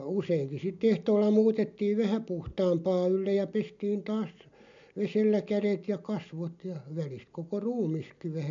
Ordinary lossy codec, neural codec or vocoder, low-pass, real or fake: MP3, 64 kbps; none; 7.2 kHz; real